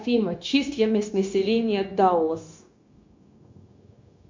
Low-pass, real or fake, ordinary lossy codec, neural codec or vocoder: 7.2 kHz; fake; MP3, 48 kbps; codec, 16 kHz, 0.9 kbps, LongCat-Audio-Codec